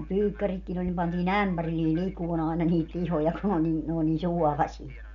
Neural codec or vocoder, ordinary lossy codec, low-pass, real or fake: none; none; 7.2 kHz; real